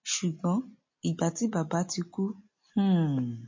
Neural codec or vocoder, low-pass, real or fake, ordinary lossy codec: none; 7.2 kHz; real; MP3, 32 kbps